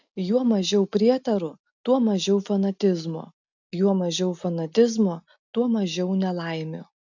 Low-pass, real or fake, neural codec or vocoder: 7.2 kHz; real; none